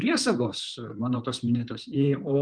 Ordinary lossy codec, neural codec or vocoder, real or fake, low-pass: Opus, 32 kbps; codec, 16 kHz in and 24 kHz out, 2.2 kbps, FireRedTTS-2 codec; fake; 9.9 kHz